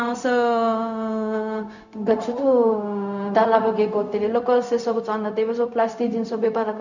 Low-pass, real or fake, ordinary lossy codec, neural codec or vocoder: 7.2 kHz; fake; none; codec, 16 kHz, 0.4 kbps, LongCat-Audio-Codec